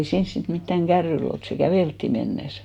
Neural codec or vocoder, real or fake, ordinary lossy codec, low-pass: none; real; none; 19.8 kHz